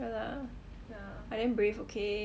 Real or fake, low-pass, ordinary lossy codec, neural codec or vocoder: real; none; none; none